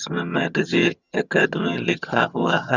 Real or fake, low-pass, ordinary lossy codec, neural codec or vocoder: fake; 7.2 kHz; Opus, 64 kbps; vocoder, 22.05 kHz, 80 mel bands, HiFi-GAN